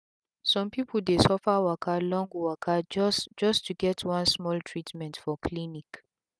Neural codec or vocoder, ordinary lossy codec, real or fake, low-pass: none; none; real; none